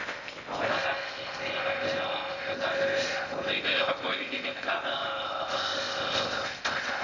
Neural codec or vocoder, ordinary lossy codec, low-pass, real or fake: codec, 16 kHz in and 24 kHz out, 0.6 kbps, FocalCodec, streaming, 2048 codes; none; 7.2 kHz; fake